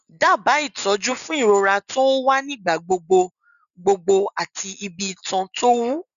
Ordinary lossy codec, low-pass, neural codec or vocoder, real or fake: none; 7.2 kHz; none; real